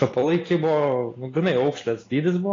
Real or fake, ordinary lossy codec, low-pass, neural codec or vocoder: real; AAC, 32 kbps; 7.2 kHz; none